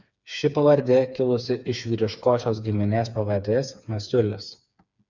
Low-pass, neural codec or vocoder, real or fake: 7.2 kHz; codec, 16 kHz, 4 kbps, FreqCodec, smaller model; fake